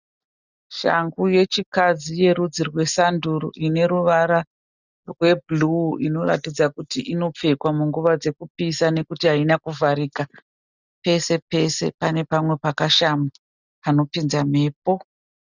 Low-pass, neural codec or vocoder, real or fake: 7.2 kHz; none; real